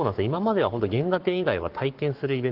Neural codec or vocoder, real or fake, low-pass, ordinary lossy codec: codec, 44.1 kHz, 7.8 kbps, Pupu-Codec; fake; 5.4 kHz; Opus, 16 kbps